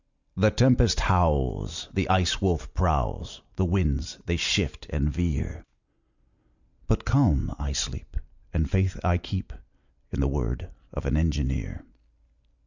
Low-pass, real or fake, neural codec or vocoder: 7.2 kHz; real; none